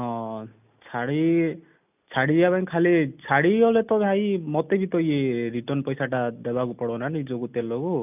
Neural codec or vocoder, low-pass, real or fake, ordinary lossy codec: none; 3.6 kHz; real; none